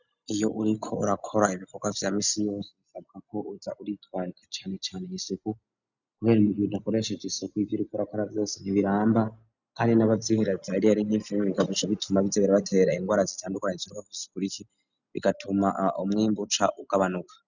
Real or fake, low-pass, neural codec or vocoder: real; 7.2 kHz; none